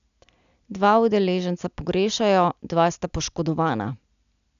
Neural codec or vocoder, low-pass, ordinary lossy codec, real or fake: none; 7.2 kHz; none; real